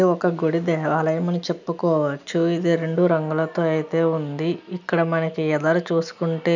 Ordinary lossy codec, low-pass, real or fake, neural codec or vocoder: none; 7.2 kHz; real; none